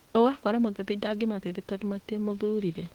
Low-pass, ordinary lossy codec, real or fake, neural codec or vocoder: 19.8 kHz; Opus, 16 kbps; fake; autoencoder, 48 kHz, 32 numbers a frame, DAC-VAE, trained on Japanese speech